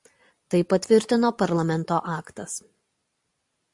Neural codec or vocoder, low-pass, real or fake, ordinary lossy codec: none; 10.8 kHz; real; AAC, 64 kbps